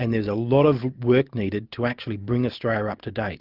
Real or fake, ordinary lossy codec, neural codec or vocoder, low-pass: real; Opus, 24 kbps; none; 5.4 kHz